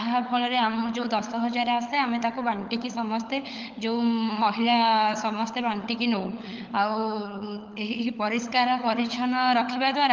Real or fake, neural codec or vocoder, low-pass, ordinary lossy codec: fake; codec, 16 kHz, 16 kbps, FunCodec, trained on LibriTTS, 50 frames a second; 7.2 kHz; Opus, 24 kbps